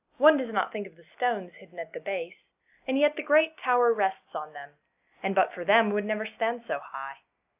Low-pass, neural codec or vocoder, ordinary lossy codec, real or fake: 3.6 kHz; none; AAC, 32 kbps; real